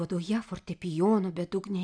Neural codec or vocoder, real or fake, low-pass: none; real; 9.9 kHz